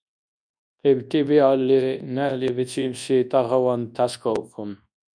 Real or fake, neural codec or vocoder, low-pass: fake; codec, 24 kHz, 0.9 kbps, WavTokenizer, large speech release; 9.9 kHz